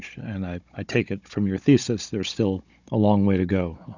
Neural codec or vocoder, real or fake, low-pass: codec, 16 kHz, 16 kbps, FunCodec, trained on Chinese and English, 50 frames a second; fake; 7.2 kHz